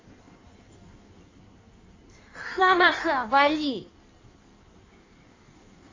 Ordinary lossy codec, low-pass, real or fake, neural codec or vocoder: AAC, 32 kbps; 7.2 kHz; fake; codec, 16 kHz in and 24 kHz out, 1.1 kbps, FireRedTTS-2 codec